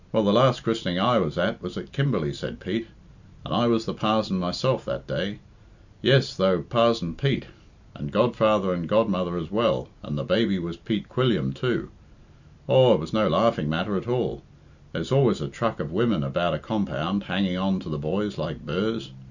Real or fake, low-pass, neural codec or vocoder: real; 7.2 kHz; none